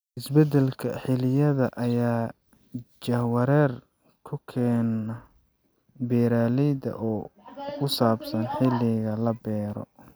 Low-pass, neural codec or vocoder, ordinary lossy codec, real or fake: none; none; none; real